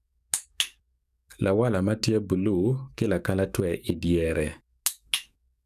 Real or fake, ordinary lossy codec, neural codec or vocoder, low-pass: fake; none; codec, 44.1 kHz, 7.8 kbps, DAC; 14.4 kHz